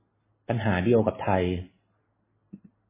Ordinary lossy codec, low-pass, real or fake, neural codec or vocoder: MP3, 16 kbps; 3.6 kHz; real; none